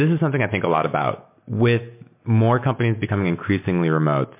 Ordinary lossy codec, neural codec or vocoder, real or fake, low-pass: MP3, 24 kbps; none; real; 3.6 kHz